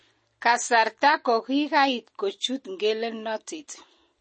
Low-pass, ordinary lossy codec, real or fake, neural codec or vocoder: 9.9 kHz; MP3, 32 kbps; real; none